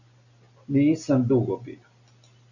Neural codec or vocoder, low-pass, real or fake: none; 7.2 kHz; real